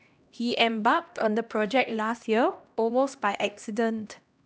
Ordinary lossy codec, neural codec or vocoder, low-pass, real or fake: none; codec, 16 kHz, 1 kbps, X-Codec, HuBERT features, trained on LibriSpeech; none; fake